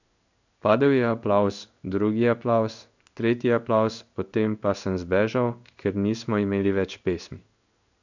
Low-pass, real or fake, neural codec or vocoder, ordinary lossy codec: 7.2 kHz; fake; codec, 16 kHz in and 24 kHz out, 1 kbps, XY-Tokenizer; none